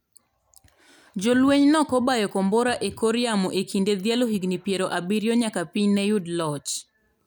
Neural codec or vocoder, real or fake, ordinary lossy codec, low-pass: none; real; none; none